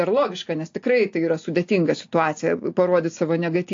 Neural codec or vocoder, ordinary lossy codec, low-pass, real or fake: none; AAC, 48 kbps; 7.2 kHz; real